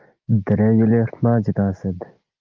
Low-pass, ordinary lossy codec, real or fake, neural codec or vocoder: 7.2 kHz; Opus, 32 kbps; real; none